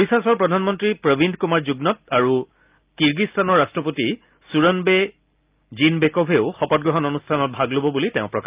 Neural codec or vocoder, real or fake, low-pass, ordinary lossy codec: none; real; 3.6 kHz; Opus, 24 kbps